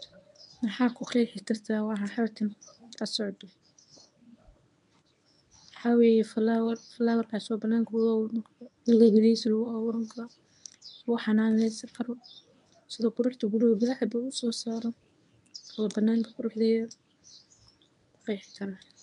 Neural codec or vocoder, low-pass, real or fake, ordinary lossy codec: codec, 24 kHz, 0.9 kbps, WavTokenizer, medium speech release version 1; 10.8 kHz; fake; none